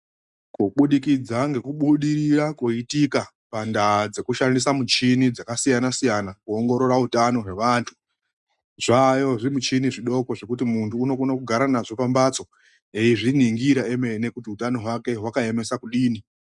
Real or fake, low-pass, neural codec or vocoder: real; 10.8 kHz; none